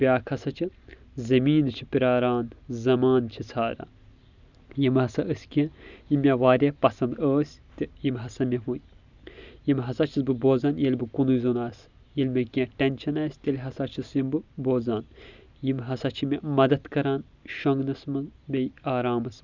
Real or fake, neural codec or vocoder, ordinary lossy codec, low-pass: real; none; none; 7.2 kHz